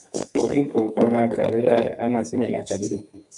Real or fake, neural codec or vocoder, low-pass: fake; codec, 32 kHz, 1.9 kbps, SNAC; 10.8 kHz